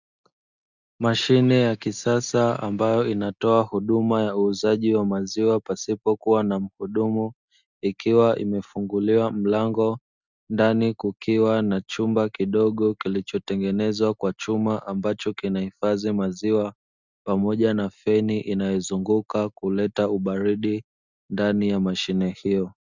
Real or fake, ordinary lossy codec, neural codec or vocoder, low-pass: real; Opus, 64 kbps; none; 7.2 kHz